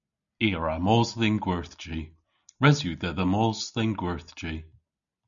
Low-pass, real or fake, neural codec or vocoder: 7.2 kHz; real; none